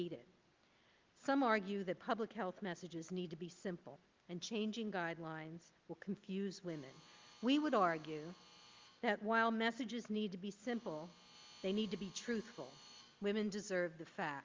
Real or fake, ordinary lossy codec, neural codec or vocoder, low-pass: real; Opus, 32 kbps; none; 7.2 kHz